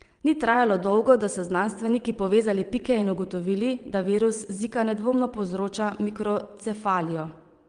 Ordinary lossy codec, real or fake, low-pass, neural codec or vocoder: Opus, 24 kbps; fake; 9.9 kHz; vocoder, 22.05 kHz, 80 mel bands, WaveNeXt